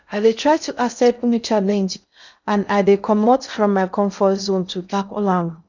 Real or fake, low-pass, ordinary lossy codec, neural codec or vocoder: fake; 7.2 kHz; none; codec, 16 kHz in and 24 kHz out, 0.6 kbps, FocalCodec, streaming, 2048 codes